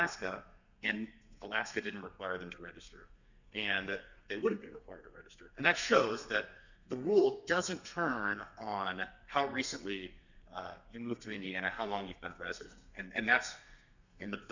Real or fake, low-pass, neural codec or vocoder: fake; 7.2 kHz; codec, 32 kHz, 1.9 kbps, SNAC